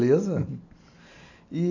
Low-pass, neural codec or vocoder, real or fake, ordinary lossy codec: 7.2 kHz; none; real; none